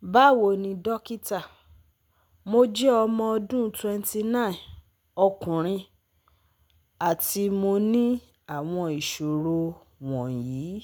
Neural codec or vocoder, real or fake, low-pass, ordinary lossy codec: none; real; none; none